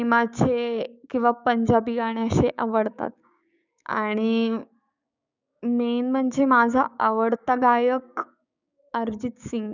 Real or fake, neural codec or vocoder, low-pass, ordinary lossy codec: fake; codec, 16 kHz, 6 kbps, DAC; 7.2 kHz; none